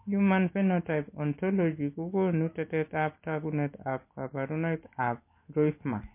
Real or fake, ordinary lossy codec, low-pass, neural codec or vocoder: real; MP3, 24 kbps; 3.6 kHz; none